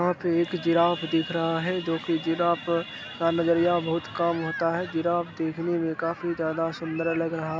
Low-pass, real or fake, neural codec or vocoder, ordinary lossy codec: none; real; none; none